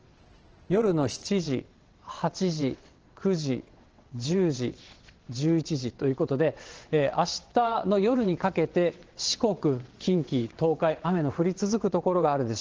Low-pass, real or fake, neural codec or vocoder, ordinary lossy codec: 7.2 kHz; fake; vocoder, 22.05 kHz, 80 mel bands, WaveNeXt; Opus, 16 kbps